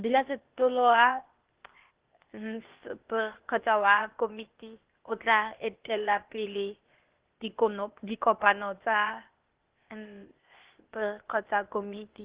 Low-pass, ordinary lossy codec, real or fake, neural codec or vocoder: 3.6 kHz; Opus, 16 kbps; fake; codec, 16 kHz, 0.8 kbps, ZipCodec